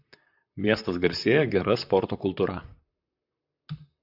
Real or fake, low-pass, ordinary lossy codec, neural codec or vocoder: fake; 5.4 kHz; AAC, 48 kbps; vocoder, 44.1 kHz, 128 mel bands, Pupu-Vocoder